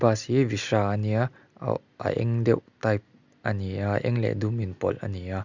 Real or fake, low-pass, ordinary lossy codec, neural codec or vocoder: real; 7.2 kHz; Opus, 64 kbps; none